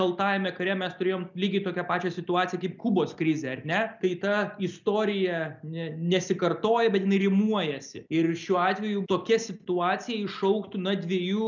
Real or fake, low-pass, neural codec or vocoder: real; 7.2 kHz; none